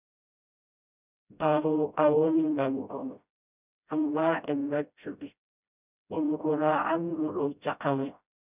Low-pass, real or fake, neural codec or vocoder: 3.6 kHz; fake; codec, 16 kHz, 0.5 kbps, FreqCodec, smaller model